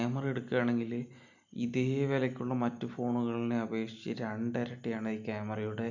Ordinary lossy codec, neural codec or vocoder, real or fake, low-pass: none; none; real; 7.2 kHz